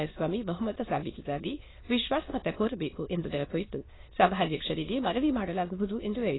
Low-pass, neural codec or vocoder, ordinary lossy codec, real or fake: 7.2 kHz; autoencoder, 22.05 kHz, a latent of 192 numbers a frame, VITS, trained on many speakers; AAC, 16 kbps; fake